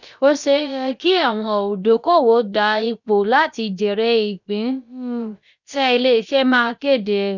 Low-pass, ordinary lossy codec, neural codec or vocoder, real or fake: 7.2 kHz; none; codec, 16 kHz, about 1 kbps, DyCAST, with the encoder's durations; fake